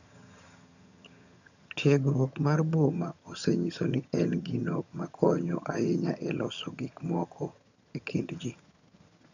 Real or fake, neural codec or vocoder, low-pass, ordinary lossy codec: fake; vocoder, 22.05 kHz, 80 mel bands, HiFi-GAN; 7.2 kHz; none